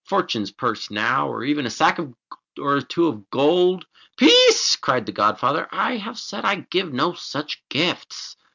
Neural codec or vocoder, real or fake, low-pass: none; real; 7.2 kHz